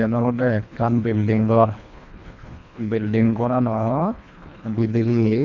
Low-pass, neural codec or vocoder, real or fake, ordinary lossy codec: 7.2 kHz; codec, 24 kHz, 1.5 kbps, HILCodec; fake; none